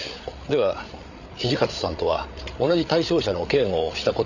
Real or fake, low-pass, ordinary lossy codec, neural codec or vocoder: fake; 7.2 kHz; none; codec, 16 kHz, 8 kbps, FreqCodec, larger model